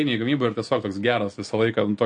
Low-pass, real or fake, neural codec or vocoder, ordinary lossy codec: 9.9 kHz; real; none; MP3, 48 kbps